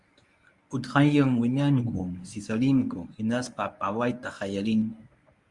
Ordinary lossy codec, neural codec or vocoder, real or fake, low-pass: Opus, 64 kbps; codec, 24 kHz, 0.9 kbps, WavTokenizer, medium speech release version 1; fake; 10.8 kHz